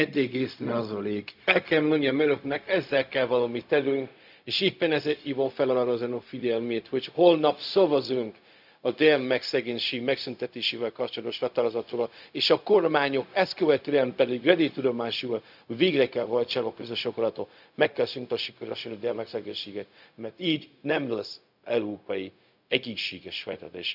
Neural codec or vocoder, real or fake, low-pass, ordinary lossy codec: codec, 16 kHz, 0.4 kbps, LongCat-Audio-Codec; fake; 5.4 kHz; none